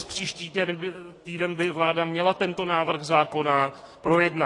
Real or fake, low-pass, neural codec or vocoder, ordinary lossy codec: fake; 10.8 kHz; codec, 32 kHz, 1.9 kbps, SNAC; AAC, 32 kbps